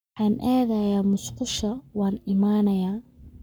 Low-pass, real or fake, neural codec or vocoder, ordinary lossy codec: none; fake; codec, 44.1 kHz, 7.8 kbps, Pupu-Codec; none